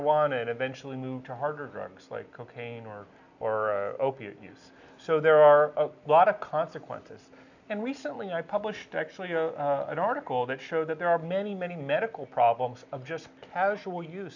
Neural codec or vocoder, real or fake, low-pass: none; real; 7.2 kHz